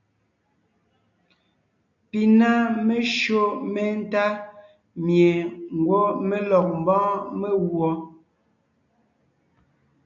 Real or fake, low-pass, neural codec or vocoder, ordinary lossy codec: real; 7.2 kHz; none; AAC, 48 kbps